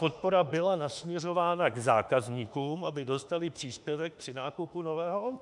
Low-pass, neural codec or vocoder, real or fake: 10.8 kHz; autoencoder, 48 kHz, 32 numbers a frame, DAC-VAE, trained on Japanese speech; fake